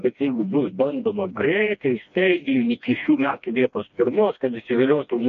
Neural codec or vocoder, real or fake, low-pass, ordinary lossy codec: codec, 16 kHz, 1 kbps, FreqCodec, smaller model; fake; 7.2 kHz; MP3, 48 kbps